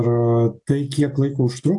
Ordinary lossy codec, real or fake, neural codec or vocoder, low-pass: AAC, 64 kbps; real; none; 10.8 kHz